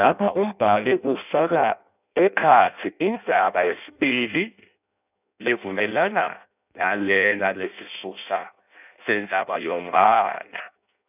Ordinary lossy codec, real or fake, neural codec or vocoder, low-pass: none; fake; codec, 16 kHz in and 24 kHz out, 0.6 kbps, FireRedTTS-2 codec; 3.6 kHz